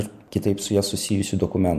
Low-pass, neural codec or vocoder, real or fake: 14.4 kHz; none; real